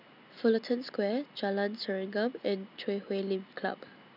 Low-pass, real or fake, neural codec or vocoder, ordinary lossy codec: 5.4 kHz; real; none; none